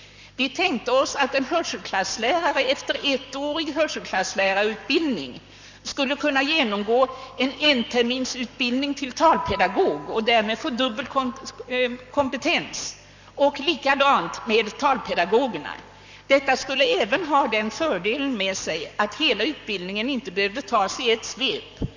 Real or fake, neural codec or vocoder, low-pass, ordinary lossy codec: fake; codec, 44.1 kHz, 7.8 kbps, Pupu-Codec; 7.2 kHz; none